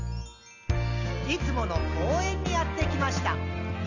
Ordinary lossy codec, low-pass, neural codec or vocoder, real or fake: none; 7.2 kHz; none; real